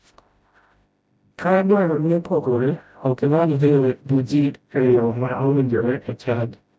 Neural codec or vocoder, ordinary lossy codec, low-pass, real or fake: codec, 16 kHz, 0.5 kbps, FreqCodec, smaller model; none; none; fake